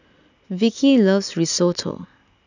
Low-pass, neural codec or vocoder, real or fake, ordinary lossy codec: 7.2 kHz; none; real; none